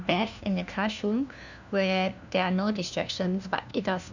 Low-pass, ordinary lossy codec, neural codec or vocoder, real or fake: 7.2 kHz; none; codec, 16 kHz, 1 kbps, FunCodec, trained on LibriTTS, 50 frames a second; fake